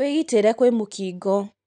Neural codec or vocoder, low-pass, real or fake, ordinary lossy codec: none; 9.9 kHz; real; none